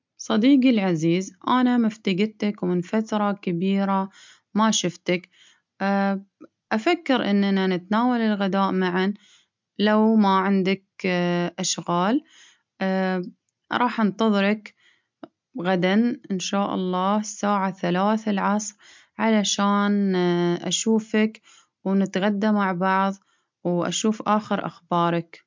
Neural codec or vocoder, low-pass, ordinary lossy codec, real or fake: none; 7.2 kHz; MP3, 64 kbps; real